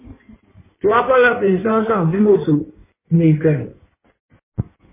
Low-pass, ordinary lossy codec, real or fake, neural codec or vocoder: 3.6 kHz; MP3, 16 kbps; fake; codec, 16 kHz in and 24 kHz out, 1.1 kbps, FireRedTTS-2 codec